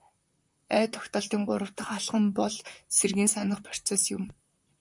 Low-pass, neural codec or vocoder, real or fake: 10.8 kHz; vocoder, 44.1 kHz, 128 mel bands, Pupu-Vocoder; fake